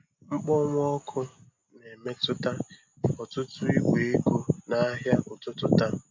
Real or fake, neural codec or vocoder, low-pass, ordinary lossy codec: real; none; 7.2 kHz; MP3, 48 kbps